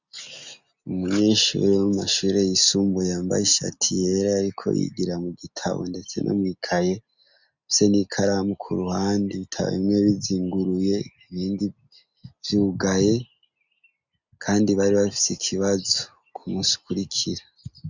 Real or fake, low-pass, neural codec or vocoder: real; 7.2 kHz; none